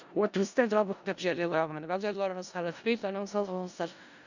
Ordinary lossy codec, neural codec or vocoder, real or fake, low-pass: none; codec, 16 kHz in and 24 kHz out, 0.4 kbps, LongCat-Audio-Codec, four codebook decoder; fake; 7.2 kHz